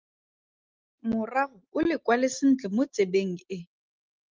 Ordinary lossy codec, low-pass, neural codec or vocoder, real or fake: Opus, 32 kbps; 7.2 kHz; none; real